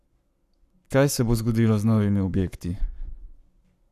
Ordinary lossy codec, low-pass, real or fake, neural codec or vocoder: none; 14.4 kHz; fake; codec, 44.1 kHz, 7.8 kbps, Pupu-Codec